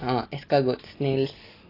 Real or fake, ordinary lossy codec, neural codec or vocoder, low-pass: real; AAC, 24 kbps; none; 5.4 kHz